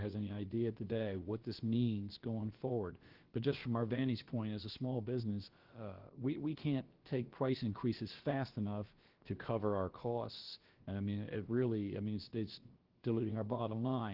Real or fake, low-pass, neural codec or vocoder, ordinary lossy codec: fake; 5.4 kHz; codec, 16 kHz, about 1 kbps, DyCAST, with the encoder's durations; Opus, 16 kbps